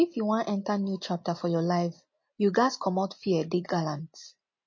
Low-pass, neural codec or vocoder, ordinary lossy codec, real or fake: 7.2 kHz; none; MP3, 32 kbps; real